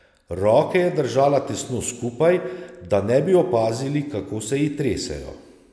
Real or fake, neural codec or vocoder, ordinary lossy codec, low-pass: real; none; none; none